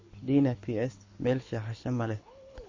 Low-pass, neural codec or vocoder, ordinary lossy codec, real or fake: 7.2 kHz; codec, 24 kHz, 6 kbps, HILCodec; MP3, 32 kbps; fake